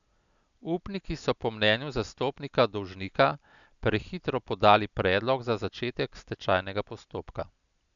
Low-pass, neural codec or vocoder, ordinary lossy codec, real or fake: 7.2 kHz; none; none; real